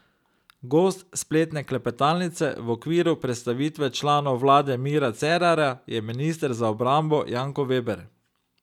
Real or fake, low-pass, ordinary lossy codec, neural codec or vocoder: real; 19.8 kHz; none; none